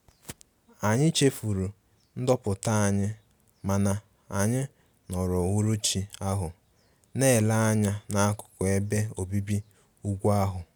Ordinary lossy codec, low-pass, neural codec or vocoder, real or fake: none; none; none; real